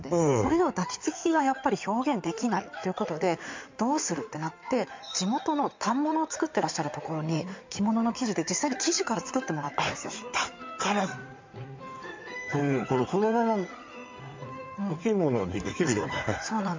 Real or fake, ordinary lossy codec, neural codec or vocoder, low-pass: fake; MP3, 64 kbps; codec, 16 kHz in and 24 kHz out, 2.2 kbps, FireRedTTS-2 codec; 7.2 kHz